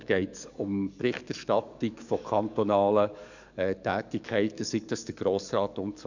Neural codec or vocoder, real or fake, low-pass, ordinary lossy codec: codec, 44.1 kHz, 7.8 kbps, DAC; fake; 7.2 kHz; none